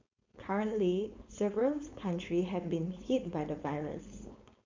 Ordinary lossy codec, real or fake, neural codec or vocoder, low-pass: MP3, 64 kbps; fake; codec, 16 kHz, 4.8 kbps, FACodec; 7.2 kHz